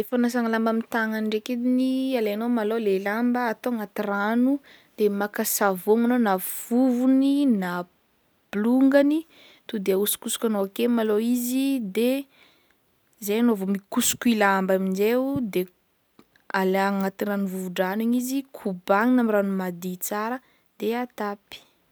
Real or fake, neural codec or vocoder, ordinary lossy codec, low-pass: real; none; none; none